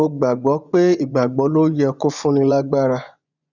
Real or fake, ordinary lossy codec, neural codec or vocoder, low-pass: fake; none; vocoder, 24 kHz, 100 mel bands, Vocos; 7.2 kHz